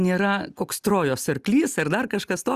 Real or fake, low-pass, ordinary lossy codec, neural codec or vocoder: real; 14.4 kHz; Opus, 64 kbps; none